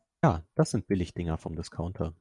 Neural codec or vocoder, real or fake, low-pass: none; real; 10.8 kHz